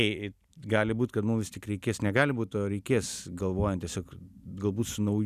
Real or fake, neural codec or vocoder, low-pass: real; none; 14.4 kHz